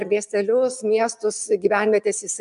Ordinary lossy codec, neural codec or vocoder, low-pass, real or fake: MP3, 96 kbps; none; 10.8 kHz; real